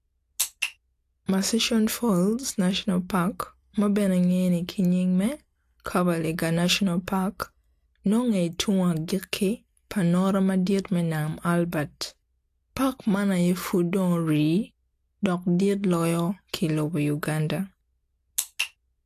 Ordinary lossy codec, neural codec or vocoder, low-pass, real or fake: AAC, 64 kbps; none; 14.4 kHz; real